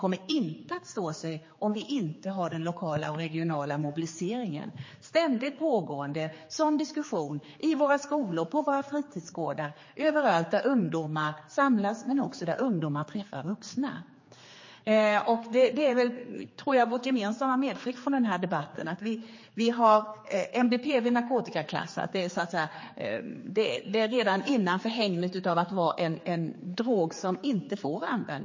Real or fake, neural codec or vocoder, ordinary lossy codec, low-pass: fake; codec, 16 kHz, 4 kbps, X-Codec, HuBERT features, trained on general audio; MP3, 32 kbps; 7.2 kHz